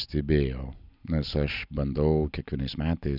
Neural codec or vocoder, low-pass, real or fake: none; 5.4 kHz; real